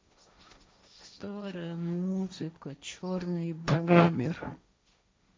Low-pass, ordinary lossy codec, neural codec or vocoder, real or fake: none; none; codec, 16 kHz, 1.1 kbps, Voila-Tokenizer; fake